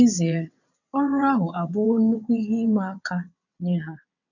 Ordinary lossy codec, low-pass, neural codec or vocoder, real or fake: none; 7.2 kHz; vocoder, 22.05 kHz, 80 mel bands, WaveNeXt; fake